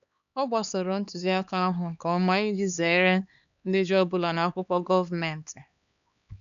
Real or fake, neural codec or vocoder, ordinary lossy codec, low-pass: fake; codec, 16 kHz, 2 kbps, X-Codec, HuBERT features, trained on LibriSpeech; none; 7.2 kHz